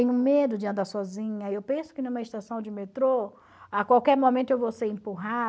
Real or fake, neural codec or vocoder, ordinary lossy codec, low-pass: real; none; none; none